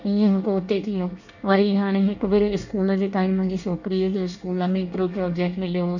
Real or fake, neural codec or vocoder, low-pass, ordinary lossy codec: fake; codec, 24 kHz, 1 kbps, SNAC; 7.2 kHz; none